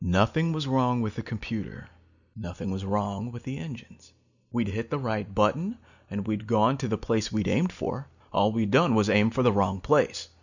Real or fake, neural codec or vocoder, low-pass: real; none; 7.2 kHz